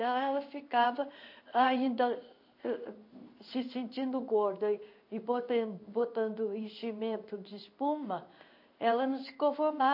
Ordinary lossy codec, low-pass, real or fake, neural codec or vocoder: MP3, 32 kbps; 5.4 kHz; fake; codec, 16 kHz in and 24 kHz out, 1 kbps, XY-Tokenizer